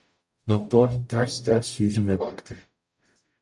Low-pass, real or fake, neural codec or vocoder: 10.8 kHz; fake; codec, 44.1 kHz, 0.9 kbps, DAC